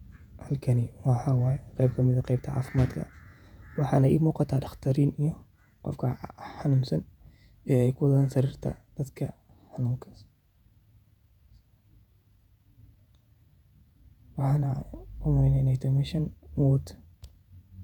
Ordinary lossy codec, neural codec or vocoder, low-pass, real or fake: none; vocoder, 48 kHz, 128 mel bands, Vocos; 19.8 kHz; fake